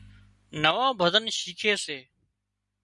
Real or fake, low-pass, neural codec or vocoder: real; 10.8 kHz; none